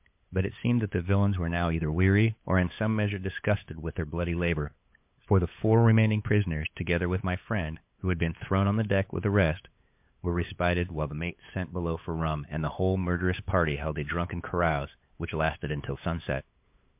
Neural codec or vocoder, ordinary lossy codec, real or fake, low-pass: codec, 16 kHz, 8 kbps, FunCodec, trained on LibriTTS, 25 frames a second; MP3, 32 kbps; fake; 3.6 kHz